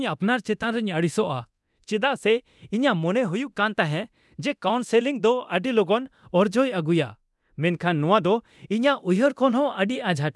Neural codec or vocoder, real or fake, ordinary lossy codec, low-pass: codec, 24 kHz, 0.9 kbps, DualCodec; fake; none; none